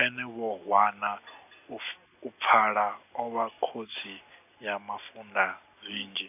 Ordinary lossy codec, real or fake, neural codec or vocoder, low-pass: none; real; none; 3.6 kHz